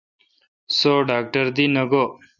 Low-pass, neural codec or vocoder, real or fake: 7.2 kHz; none; real